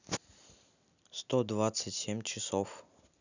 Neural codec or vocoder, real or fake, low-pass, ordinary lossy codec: none; real; 7.2 kHz; none